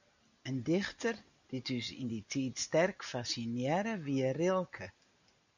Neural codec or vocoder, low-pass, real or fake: none; 7.2 kHz; real